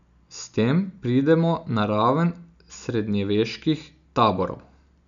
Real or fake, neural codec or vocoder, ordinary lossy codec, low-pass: real; none; none; 7.2 kHz